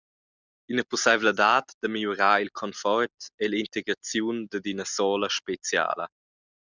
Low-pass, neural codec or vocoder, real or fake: 7.2 kHz; none; real